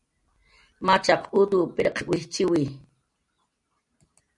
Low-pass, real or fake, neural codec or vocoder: 10.8 kHz; real; none